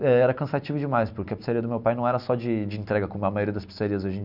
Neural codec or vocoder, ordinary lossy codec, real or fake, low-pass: none; none; real; 5.4 kHz